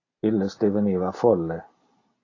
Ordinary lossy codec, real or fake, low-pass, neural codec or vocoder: AAC, 32 kbps; real; 7.2 kHz; none